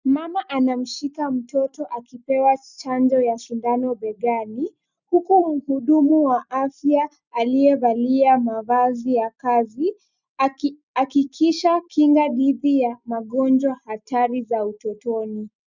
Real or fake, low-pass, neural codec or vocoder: real; 7.2 kHz; none